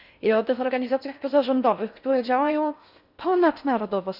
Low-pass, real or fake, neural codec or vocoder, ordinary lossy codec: 5.4 kHz; fake; codec, 16 kHz in and 24 kHz out, 0.6 kbps, FocalCodec, streaming, 4096 codes; none